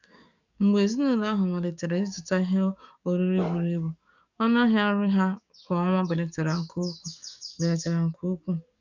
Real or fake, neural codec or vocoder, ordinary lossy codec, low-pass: fake; codec, 44.1 kHz, 7.8 kbps, DAC; none; 7.2 kHz